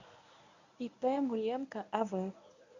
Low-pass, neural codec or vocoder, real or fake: 7.2 kHz; codec, 24 kHz, 0.9 kbps, WavTokenizer, medium speech release version 1; fake